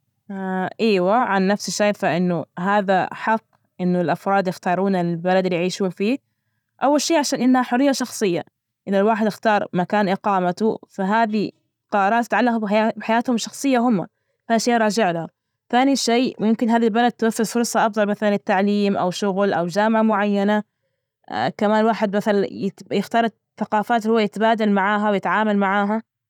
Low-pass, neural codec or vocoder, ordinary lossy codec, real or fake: 19.8 kHz; none; none; real